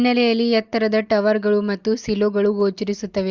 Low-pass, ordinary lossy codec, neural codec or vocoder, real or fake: 7.2 kHz; Opus, 32 kbps; none; real